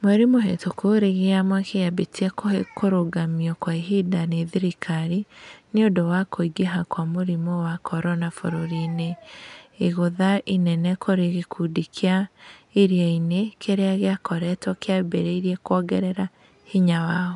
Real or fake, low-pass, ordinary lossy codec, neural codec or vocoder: real; 10.8 kHz; none; none